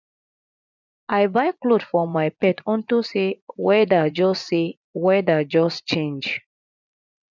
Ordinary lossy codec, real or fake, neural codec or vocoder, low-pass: none; fake; vocoder, 24 kHz, 100 mel bands, Vocos; 7.2 kHz